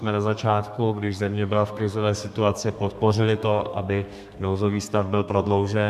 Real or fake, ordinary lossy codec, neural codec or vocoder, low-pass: fake; MP3, 96 kbps; codec, 32 kHz, 1.9 kbps, SNAC; 14.4 kHz